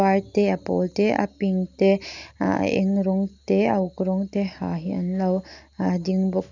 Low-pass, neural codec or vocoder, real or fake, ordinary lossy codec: 7.2 kHz; none; real; none